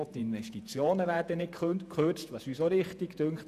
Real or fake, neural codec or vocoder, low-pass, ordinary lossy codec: fake; vocoder, 48 kHz, 128 mel bands, Vocos; 14.4 kHz; none